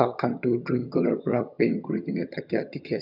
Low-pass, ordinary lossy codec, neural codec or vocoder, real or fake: 5.4 kHz; none; vocoder, 22.05 kHz, 80 mel bands, HiFi-GAN; fake